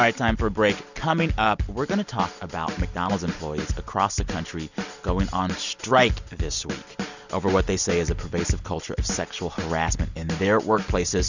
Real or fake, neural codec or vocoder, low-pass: real; none; 7.2 kHz